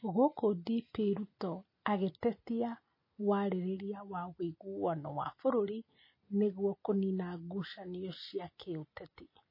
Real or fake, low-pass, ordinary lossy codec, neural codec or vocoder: real; 5.4 kHz; MP3, 24 kbps; none